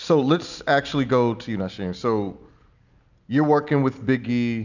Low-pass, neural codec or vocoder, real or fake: 7.2 kHz; none; real